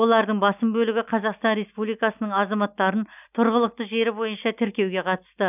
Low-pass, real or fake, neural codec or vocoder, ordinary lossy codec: 3.6 kHz; real; none; none